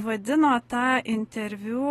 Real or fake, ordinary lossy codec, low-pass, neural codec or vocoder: real; AAC, 32 kbps; 19.8 kHz; none